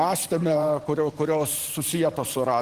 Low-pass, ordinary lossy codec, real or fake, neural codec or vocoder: 14.4 kHz; Opus, 16 kbps; fake; vocoder, 44.1 kHz, 128 mel bands every 512 samples, BigVGAN v2